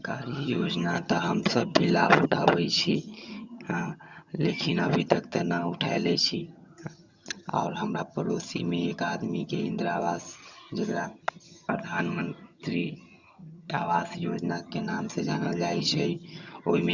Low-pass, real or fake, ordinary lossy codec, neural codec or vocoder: 7.2 kHz; fake; Opus, 64 kbps; vocoder, 22.05 kHz, 80 mel bands, HiFi-GAN